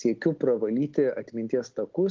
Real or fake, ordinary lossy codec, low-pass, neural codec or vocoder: real; Opus, 16 kbps; 7.2 kHz; none